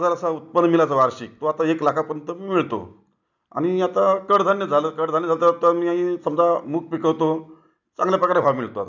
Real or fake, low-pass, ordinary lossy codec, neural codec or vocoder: real; 7.2 kHz; none; none